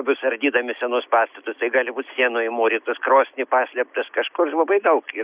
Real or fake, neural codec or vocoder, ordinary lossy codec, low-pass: real; none; Opus, 64 kbps; 3.6 kHz